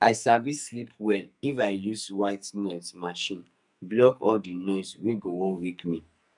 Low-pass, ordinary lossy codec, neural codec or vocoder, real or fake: 10.8 kHz; none; codec, 44.1 kHz, 2.6 kbps, SNAC; fake